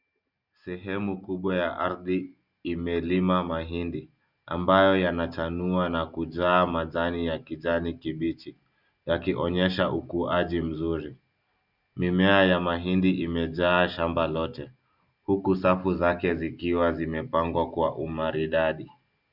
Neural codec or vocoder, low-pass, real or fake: none; 5.4 kHz; real